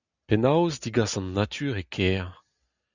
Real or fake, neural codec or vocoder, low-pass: real; none; 7.2 kHz